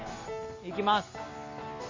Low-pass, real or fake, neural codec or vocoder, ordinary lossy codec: 7.2 kHz; real; none; MP3, 32 kbps